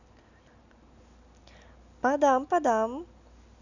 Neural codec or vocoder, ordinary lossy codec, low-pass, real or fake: none; none; 7.2 kHz; real